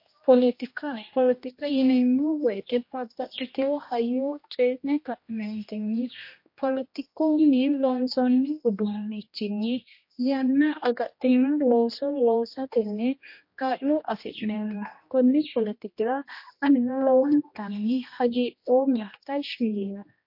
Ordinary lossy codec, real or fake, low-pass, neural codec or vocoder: MP3, 32 kbps; fake; 5.4 kHz; codec, 16 kHz, 1 kbps, X-Codec, HuBERT features, trained on general audio